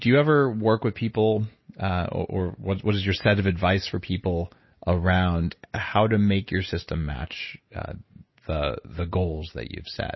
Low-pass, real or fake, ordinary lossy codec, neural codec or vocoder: 7.2 kHz; real; MP3, 24 kbps; none